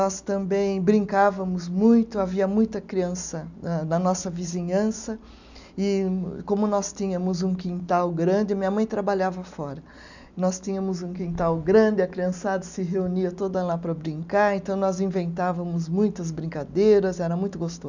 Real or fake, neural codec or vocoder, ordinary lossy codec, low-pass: real; none; none; 7.2 kHz